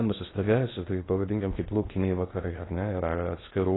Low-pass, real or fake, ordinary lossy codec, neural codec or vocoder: 7.2 kHz; fake; AAC, 16 kbps; codec, 16 kHz in and 24 kHz out, 0.8 kbps, FocalCodec, streaming, 65536 codes